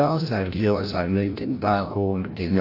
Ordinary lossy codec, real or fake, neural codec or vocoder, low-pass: AAC, 32 kbps; fake; codec, 16 kHz, 0.5 kbps, FreqCodec, larger model; 5.4 kHz